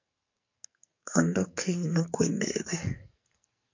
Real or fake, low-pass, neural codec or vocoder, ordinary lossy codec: fake; 7.2 kHz; codec, 44.1 kHz, 2.6 kbps, SNAC; MP3, 48 kbps